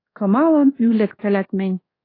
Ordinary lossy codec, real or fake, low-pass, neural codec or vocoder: AAC, 24 kbps; fake; 5.4 kHz; codec, 24 kHz, 1.2 kbps, DualCodec